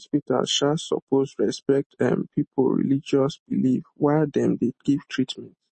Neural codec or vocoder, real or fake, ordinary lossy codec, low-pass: none; real; MP3, 32 kbps; 10.8 kHz